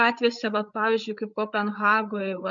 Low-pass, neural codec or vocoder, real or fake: 7.2 kHz; codec, 16 kHz, 8 kbps, FreqCodec, larger model; fake